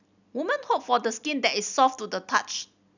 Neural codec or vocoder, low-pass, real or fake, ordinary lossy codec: none; 7.2 kHz; real; none